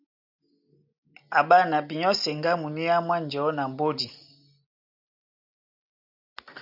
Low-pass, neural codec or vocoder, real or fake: 5.4 kHz; none; real